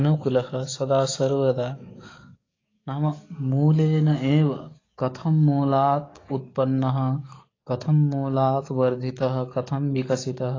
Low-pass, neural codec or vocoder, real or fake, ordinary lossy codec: 7.2 kHz; codec, 44.1 kHz, 7.8 kbps, DAC; fake; AAC, 32 kbps